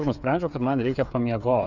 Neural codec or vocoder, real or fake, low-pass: codec, 16 kHz, 8 kbps, FreqCodec, smaller model; fake; 7.2 kHz